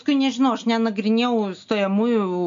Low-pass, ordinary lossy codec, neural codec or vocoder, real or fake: 7.2 kHz; AAC, 64 kbps; none; real